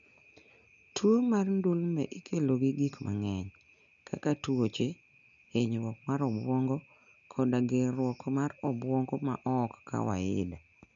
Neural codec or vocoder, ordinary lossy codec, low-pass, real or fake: none; none; 7.2 kHz; real